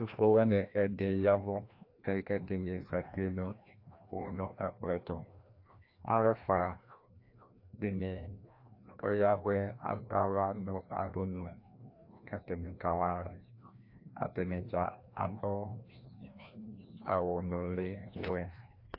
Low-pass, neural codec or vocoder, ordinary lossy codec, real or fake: 5.4 kHz; codec, 16 kHz, 1 kbps, FreqCodec, larger model; AAC, 32 kbps; fake